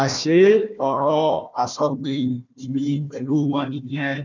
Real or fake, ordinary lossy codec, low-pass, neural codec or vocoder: fake; none; 7.2 kHz; codec, 16 kHz, 1 kbps, FunCodec, trained on Chinese and English, 50 frames a second